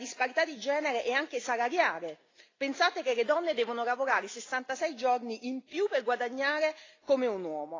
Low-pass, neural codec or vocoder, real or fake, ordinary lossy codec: 7.2 kHz; none; real; AAC, 32 kbps